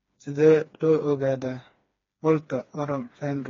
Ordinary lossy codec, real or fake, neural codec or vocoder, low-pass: AAC, 32 kbps; fake; codec, 16 kHz, 2 kbps, FreqCodec, smaller model; 7.2 kHz